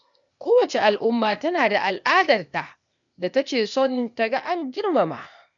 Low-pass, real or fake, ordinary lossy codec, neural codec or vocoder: 7.2 kHz; fake; none; codec, 16 kHz, 0.8 kbps, ZipCodec